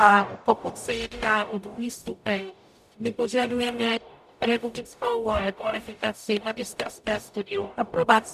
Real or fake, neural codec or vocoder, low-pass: fake; codec, 44.1 kHz, 0.9 kbps, DAC; 14.4 kHz